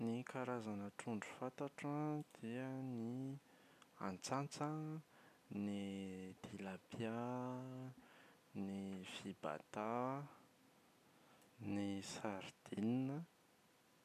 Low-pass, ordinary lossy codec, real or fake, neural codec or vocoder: 14.4 kHz; none; real; none